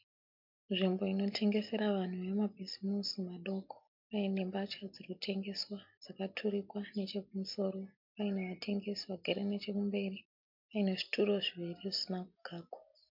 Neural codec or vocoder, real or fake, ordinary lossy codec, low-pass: none; real; MP3, 48 kbps; 5.4 kHz